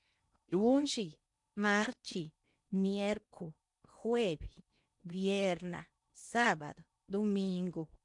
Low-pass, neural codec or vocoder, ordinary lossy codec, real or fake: 10.8 kHz; codec, 16 kHz in and 24 kHz out, 0.8 kbps, FocalCodec, streaming, 65536 codes; none; fake